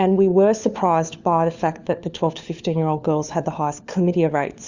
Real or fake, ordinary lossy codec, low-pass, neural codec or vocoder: fake; Opus, 64 kbps; 7.2 kHz; codec, 16 kHz, 4 kbps, FunCodec, trained on LibriTTS, 50 frames a second